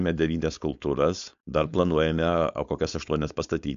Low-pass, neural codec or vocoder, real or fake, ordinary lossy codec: 7.2 kHz; codec, 16 kHz, 4.8 kbps, FACodec; fake; MP3, 64 kbps